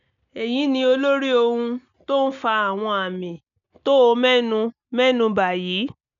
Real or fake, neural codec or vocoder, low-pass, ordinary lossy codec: real; none; 7.2 kHz; none